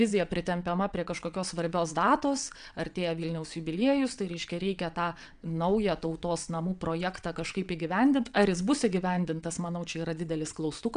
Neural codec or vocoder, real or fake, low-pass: vocoder, 22.05 kHz, 80 mel bands, WaveNeXt; fake; 9.9 kHz